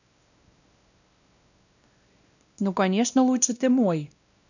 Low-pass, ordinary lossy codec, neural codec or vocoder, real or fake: 7.2 kHz; none; codec, 16 kHz, 1 kbps, X-Codec, WavLM features, trained on Multilingual LibriSpeech; fake